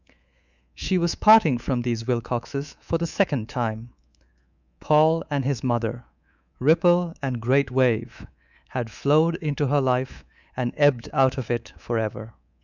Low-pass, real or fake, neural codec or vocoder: 7.2 kHz; fake; codec, 24 kHz, 3.1 kbps, DualCodec